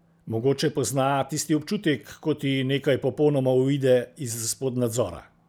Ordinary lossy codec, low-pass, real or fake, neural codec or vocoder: none; none; real; none